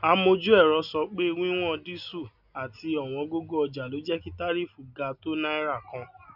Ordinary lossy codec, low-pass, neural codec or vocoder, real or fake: none; 5.4 kHz; none; real